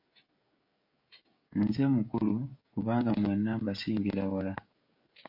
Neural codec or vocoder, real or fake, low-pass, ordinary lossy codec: codec, 16 kHz, 16 kbps, FreqCodec, smaller model; fake; 5.4 kHz; MP3, 32 kbps